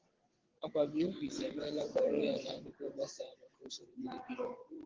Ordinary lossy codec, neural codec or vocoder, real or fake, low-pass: Opus, 16 kbps; vocoder, 22.05 kHz, 80 mel bands, WaveNeXt; fake; 7.2 kHz